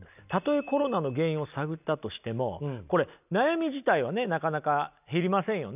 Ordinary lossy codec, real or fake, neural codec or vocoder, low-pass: none; real; none; 3.6 kHz